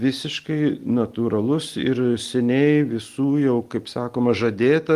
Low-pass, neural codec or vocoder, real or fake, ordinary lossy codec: 14.4 kHz; none; real; Opus, 24 kbps